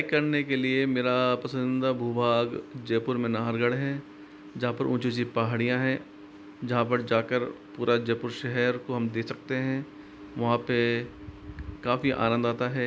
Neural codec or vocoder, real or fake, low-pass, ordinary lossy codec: none; real; none; none